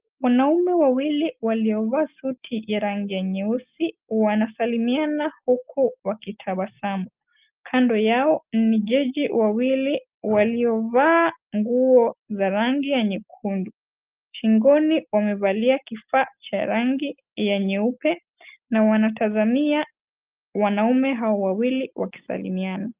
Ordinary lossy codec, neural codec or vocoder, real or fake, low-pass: Opus, 32 kbps; none; real; 3.6 kHz